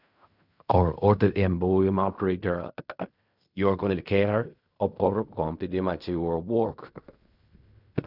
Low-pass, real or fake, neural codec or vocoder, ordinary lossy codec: 5.4 kHz; fake; codec, 16 kHz in and 24 kHz out, 0.4 kbps, LongCat-Audio-Codec, fine tuned four codebook decoder; none